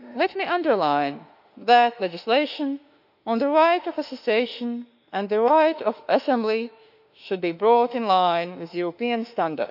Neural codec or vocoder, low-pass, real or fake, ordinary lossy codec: autoencoder, 48 kHz, 32 numbers a frame, DAC-VAE, trained on Japanese speech; 5.4 kHz; fake; none